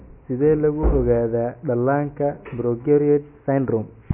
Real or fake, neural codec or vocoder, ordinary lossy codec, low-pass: real; none; MP3, 24 kbps; 3.6 kHz